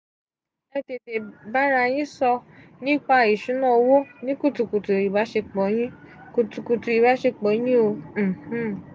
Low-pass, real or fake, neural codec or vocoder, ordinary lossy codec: none; real; none; none